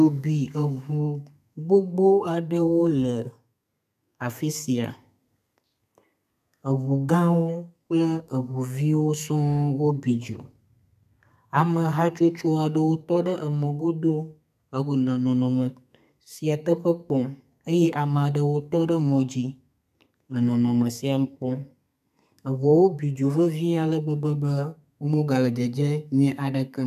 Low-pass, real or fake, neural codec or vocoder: 14.4 kHz; fake; codec, 32 kHz, 1.9 kbps, SNAC